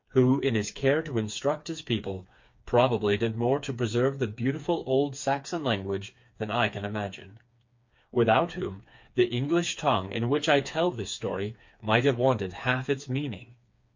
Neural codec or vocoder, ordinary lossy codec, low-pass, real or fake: codec, 16 kHz, 4 kbps, FreqCodec, smaller model; MP3, 48 kbps; 7.2 kHz; fake